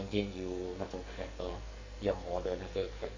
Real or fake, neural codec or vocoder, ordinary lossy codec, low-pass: fake; codec, 44.1 kHz, 2.6 kbps, SNAC; AAC, 48 kbps; 7.2 kHz